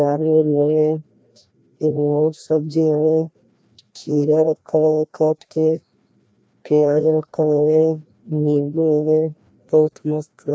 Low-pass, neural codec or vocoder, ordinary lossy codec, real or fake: none; codec, 16 kHz, 1 kbps, FreqCodec, larger model; none; fake